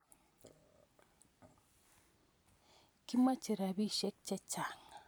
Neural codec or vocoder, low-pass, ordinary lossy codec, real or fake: vocoder, 44.1 kHz, 128 mel bands every 256 samples, BigVGAN v2; none; none; fake